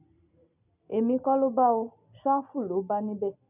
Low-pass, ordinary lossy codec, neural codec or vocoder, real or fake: 3.6 kHz; none; none; real